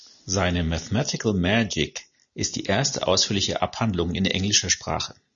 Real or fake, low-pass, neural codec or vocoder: real; 7.2 kHz; none